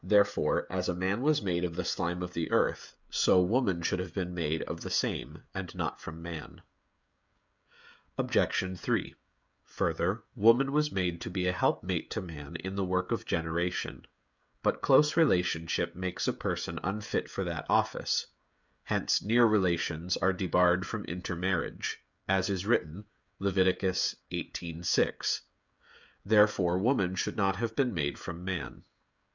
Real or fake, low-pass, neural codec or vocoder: fake; 7.2 kHz; codec, 16 kHz, 16 kbps, FreqCodec, smaller model